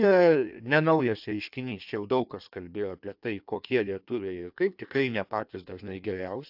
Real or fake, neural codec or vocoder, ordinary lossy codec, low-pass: fake; codec, 16 kHz in and 24 kHz out, 1.1 kbps, FireRedTTS-2 codec; AAC, 48 kbps; 5.4 kHz